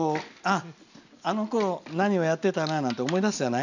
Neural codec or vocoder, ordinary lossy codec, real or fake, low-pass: none; none; real; 7.2 kHz